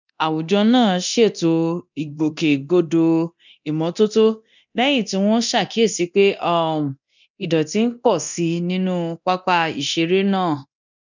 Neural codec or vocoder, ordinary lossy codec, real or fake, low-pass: codec, 24 kHz, 0.9 kbps, DualCodec; none; fake; 7.2 kHz